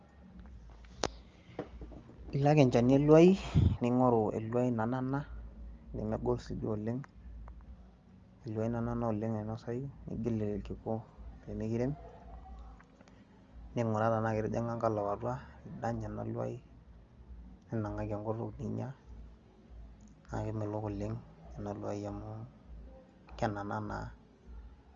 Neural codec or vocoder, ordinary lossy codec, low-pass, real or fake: none; Opus, 32 kbps; 7.2 kHz; real